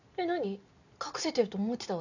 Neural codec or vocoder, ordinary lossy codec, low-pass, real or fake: none; none; 7.2 kHz; real